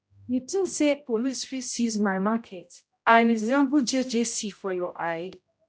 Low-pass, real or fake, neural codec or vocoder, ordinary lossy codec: none; fake; codec, 16 kHz, 0.5 kbps, X-Codec, HuBERT features, trained on general audio; none